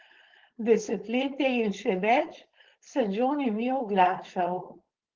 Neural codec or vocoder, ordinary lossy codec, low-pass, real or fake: codec, 16 kHz, 4.8 kbps, FACodec; Opus, 16 kbps; 7.2 kHz; fake